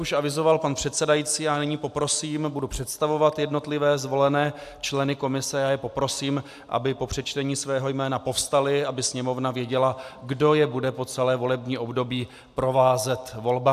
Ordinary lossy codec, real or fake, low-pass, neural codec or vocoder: AAC, 96 kbps; real; 14.4 kHz; none